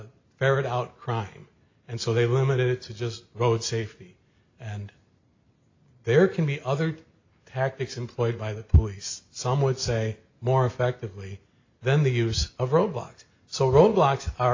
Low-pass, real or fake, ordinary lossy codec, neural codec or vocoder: 7.2 kHz; real; AAC, 48 kbps; none